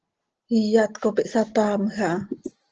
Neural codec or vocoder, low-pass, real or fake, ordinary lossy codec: none; 7.2 kHz; real; Opus, 16 kbps